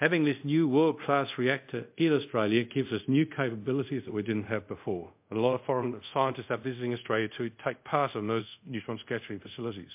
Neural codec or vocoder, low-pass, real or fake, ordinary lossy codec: codec, 24 kHz, 0.5 kbps, DualCodec; 3.6 kHz; fake; MP3, 32 kbps